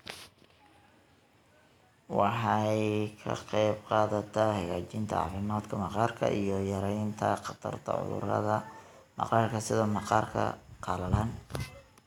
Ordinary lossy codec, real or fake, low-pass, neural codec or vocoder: none; real; 19.8 kHz; none